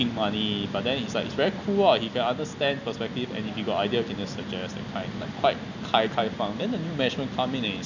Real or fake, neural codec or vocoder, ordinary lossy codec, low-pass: real; none; none; 7.2 kHz